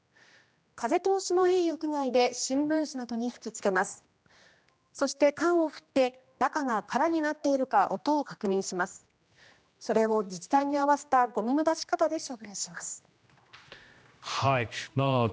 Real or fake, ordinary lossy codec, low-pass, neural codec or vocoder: fake; none; none; codec, 16 kHz, 1 kbps, X-Codec, HuBERT features, trained on general audio